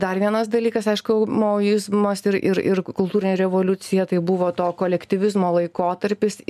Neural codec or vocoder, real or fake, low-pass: none; real; 14.4 kHz